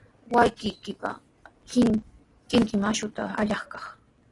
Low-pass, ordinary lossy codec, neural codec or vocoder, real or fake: 10.8 kHz; AAC, 32 kbps; none; real